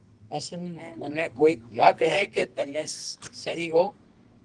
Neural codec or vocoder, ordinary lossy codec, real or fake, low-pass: codec, 24 kHz, 0.9 kbps, WavTokenizer, medium music audio release; Opus, 24 kbps; fake; 10.8 kHz